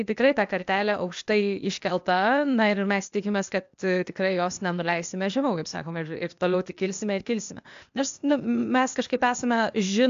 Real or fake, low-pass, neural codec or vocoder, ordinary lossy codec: fake; 7.2 kHz; codec, 16 kHz, 0.8 kbps, ZipCodec; AAC, 64 kbps